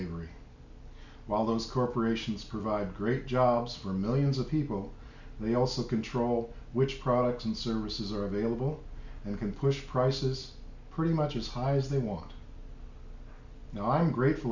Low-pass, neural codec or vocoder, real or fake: 7.2 kHz; none; real